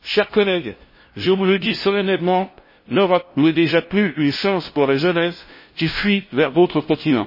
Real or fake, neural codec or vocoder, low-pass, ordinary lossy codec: fake; codec, 16 kHz, 0.5 kbps, FunCodec, trained on LibriTTS, 25 frames a second; 5.4 kHz; MP3, 24 kbps